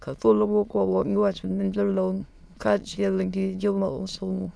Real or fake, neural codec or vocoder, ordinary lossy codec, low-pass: fake; autoencoder, 22.05 kHz, a latent of 192 numbers a frame, VITS, trained on many speakers; none; none